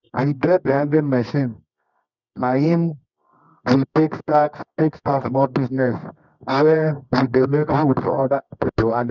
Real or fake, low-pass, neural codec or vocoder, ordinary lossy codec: fake; 7.2 kHz; codec, 24 kHz, 0.9 kbps, WavTokenizer, medium music audio release; none